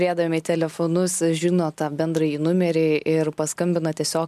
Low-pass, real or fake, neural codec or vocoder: 14.4 kHz; real; none